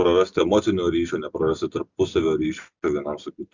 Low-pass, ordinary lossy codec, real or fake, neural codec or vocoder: 7.2 kHz; Opus, 64 kbps; real; none